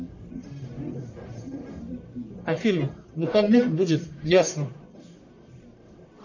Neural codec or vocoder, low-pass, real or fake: codec, 44.1 kHz, 1.7 kbps, Pupu-Codec; 7.2 kHz; fake